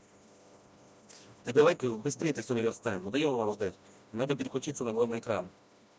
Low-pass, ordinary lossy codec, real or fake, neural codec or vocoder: none; none; fake; codec, 16 kHz, 1 kbps, FreqCodec, smaller model